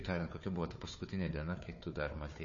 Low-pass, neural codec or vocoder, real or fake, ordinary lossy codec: 7.2 kHz; codec, 16 kHz, 4 kbps, FunCodec, trained on Chinese and English, 50 frames a second; fake; MP3, 32 kbps